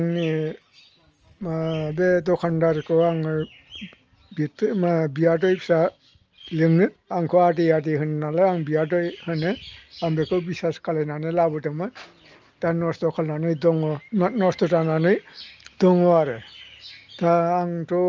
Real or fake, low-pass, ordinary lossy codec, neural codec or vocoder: real; 7.2 kHz; Opus, 24 kbps; none